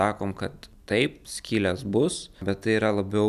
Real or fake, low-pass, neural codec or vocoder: real; 14.4 kHz; none